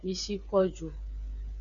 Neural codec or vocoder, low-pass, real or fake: codec, 16 kHz, 8 kbps, FreqCodec, smaller model; 7.2 kHz; fake